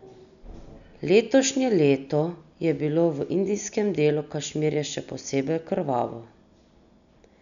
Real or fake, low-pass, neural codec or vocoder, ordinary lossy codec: real; 7.2 kHz; none; none